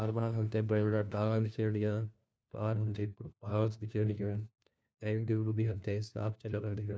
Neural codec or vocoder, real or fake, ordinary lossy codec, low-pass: codec, 16 kHz, 1 kbps, FunCodec, trained on LibriTTS, 50 frames a second; fake; none; none